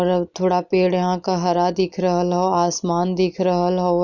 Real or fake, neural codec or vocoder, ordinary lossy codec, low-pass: real; none; none; 7.2 kHz